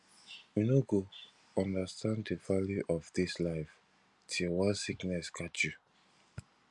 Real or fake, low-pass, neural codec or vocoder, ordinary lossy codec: real; 10.8 kHz; none; none